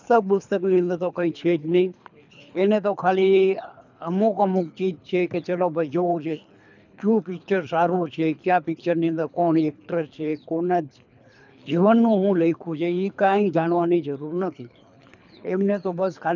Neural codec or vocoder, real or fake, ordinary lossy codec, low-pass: codec, 24 kHz, 3 kbps, HILCodec; fake; none; 7.2 kHz